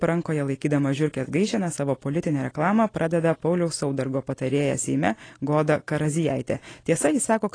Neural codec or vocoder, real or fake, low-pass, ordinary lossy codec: none; real; 9.9 kHz; AAC, 32 kbps